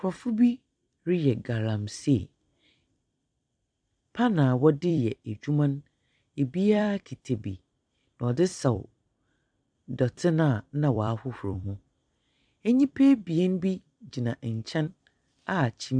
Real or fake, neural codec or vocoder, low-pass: fake; vocoder, 44.1 kHz, 128 mel bands every 256 samples, BigVGAN v2; 9.9 kHz